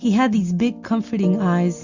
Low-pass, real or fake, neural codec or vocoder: 7.2 kHz; real; none